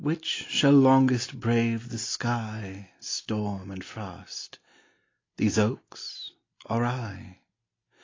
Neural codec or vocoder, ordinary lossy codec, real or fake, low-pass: none; AAC, 32 kbps; real; 7.2 kHz